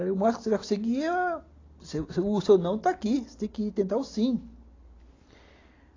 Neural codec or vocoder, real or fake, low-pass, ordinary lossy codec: none; real; 7.2 kHz; AAC, 32 kbps